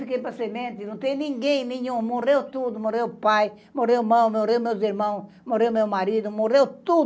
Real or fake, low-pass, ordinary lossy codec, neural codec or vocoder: real; none; none; none